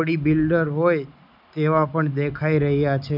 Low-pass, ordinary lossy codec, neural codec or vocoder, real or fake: 5.4 kHz; none; none; real